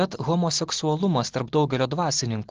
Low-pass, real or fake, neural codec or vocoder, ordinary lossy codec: 7.2 kHz; real; none; Opus, 16 kbps